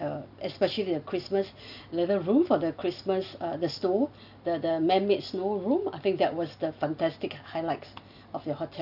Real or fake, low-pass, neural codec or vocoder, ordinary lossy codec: real; 5.4 kHz; none; none